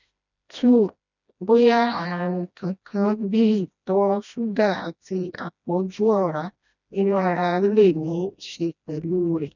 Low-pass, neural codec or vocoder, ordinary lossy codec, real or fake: 7.2 kHz; codec, 16 kHz, 1 kbps, FreqCodec, smaller model; none; fake